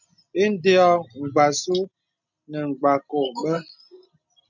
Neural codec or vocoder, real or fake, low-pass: none; real; 7.2 kHz